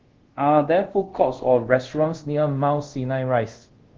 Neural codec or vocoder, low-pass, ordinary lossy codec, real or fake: codec, 24 kHz, 0.5 kbps, DualCodec; 7.2 kHz; Opus, 16 kbps; fake